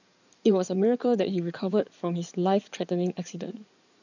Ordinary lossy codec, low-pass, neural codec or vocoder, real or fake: none; 7.2 kHz; codec, 16 kHz in and 24 kHz out, 2.2 kbps, FireRedTTS-2 codec; fake